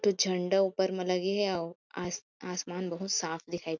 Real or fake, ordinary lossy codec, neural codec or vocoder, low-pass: real; none; none; 7.2 kHz